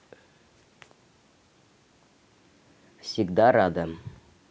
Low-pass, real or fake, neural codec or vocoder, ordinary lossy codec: none; real; none; none